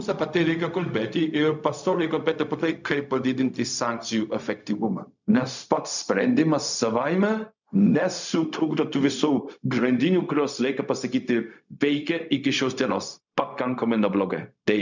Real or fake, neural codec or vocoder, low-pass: fake; codec, 16 kHz, 0.4 kbps, LongCat-Audio-Codec; 7.2 kHz